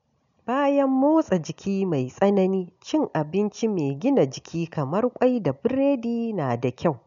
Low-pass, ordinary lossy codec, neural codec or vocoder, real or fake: 7.2 kHz; none; none; real